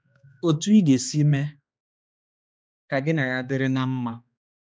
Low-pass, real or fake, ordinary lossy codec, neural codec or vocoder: none; fake; none; codec, 16 kHz, 2 kbps, X-Codec, HuBERT features, trained on balanced general audio